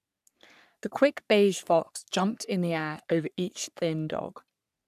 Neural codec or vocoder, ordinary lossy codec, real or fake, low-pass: codec, 44.1 kHz, 3.4 kbps, Pupu-Codec; none; fake; 14.4 kHz